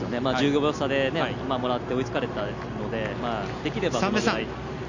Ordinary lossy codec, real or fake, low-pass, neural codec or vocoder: none; real; 7.2 kHz; none